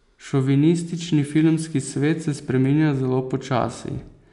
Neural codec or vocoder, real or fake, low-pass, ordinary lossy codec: none; real; 10.8 kHz; none